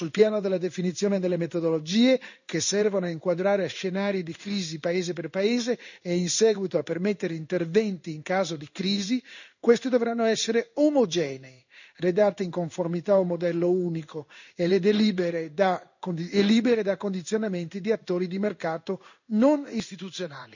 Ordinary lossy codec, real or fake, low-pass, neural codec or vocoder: none; fake; 7.2 kHz; codec, 16 kHz in and 24 kHz out, 1 kbps, XY-Tokenizer